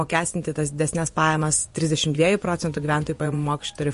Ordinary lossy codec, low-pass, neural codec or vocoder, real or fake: MP3, 48 kbps; 10.8 kHz; vocoder, 24 kHz, 100 mel bands, Vocos; fake